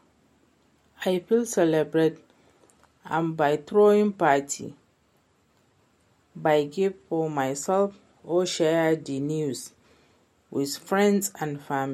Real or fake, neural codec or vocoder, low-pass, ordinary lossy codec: real; none; 19.8 kHz; MP3, 64 kbps